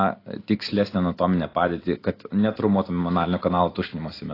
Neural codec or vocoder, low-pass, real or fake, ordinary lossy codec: none; 5.4 kHz; real; AAC, 24 kbps